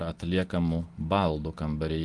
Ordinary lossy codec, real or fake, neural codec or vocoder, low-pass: Opus, 24 kbps; real; none; 10.8 kHz